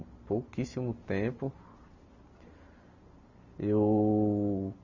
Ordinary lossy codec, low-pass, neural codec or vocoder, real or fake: none; 7.2 kHz; none; real